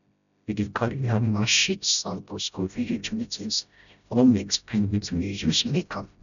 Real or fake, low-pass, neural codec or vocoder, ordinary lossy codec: fake; 7.2 kHz; codec, 16 kHz, 0.5 kbps, FreqCodec, smaller model; none